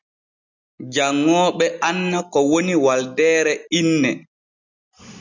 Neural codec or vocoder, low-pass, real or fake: none; 7.2 kHz; real